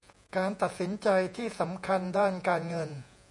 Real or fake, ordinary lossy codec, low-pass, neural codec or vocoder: fake; MP3, 96 kbps; 10.8 kHz; vocoder, 48 kHz, 128 mel bands, Vocos